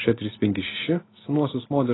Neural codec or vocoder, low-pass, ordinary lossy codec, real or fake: none; 7.2 kHz; AAC, 16 kbps; real